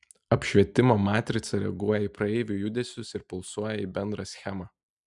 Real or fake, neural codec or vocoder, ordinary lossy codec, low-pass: real; none; MP3, 96 kbps; 10.8 kHz